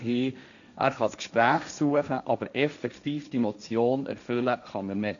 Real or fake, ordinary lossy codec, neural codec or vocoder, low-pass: fake; none; codec, 16 kHz, 1.1 kbps, Voila-Tokenizer; 7.2 kHz